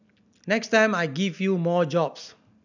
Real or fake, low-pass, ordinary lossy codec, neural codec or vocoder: real; 7.2 kHz; none; none